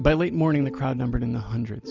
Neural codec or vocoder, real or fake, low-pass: none; real; 7.2 kHz